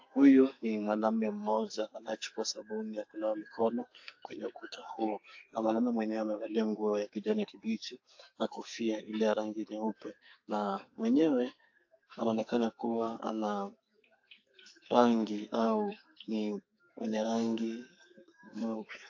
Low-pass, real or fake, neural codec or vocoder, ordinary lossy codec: 7.2 kHz; fake; codec, 44.1 kHz, 2.6 kbps, SNAC; AAC, 48 kbps